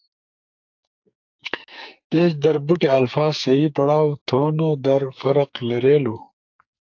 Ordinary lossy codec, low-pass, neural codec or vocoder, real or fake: AAC, 48 kbps; 7.2 kHz; codec, 44.1 kHz, 2.6 kbps, SNAC; fake